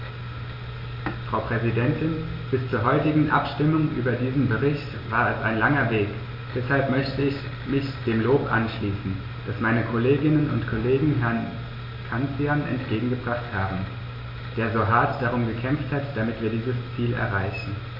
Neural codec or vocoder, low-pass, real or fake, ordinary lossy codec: none; 5.4 kHz; real; MP3, 32 kbps